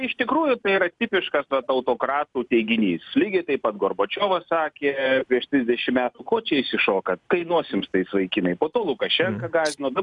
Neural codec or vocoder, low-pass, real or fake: none; 10.8 kHz; real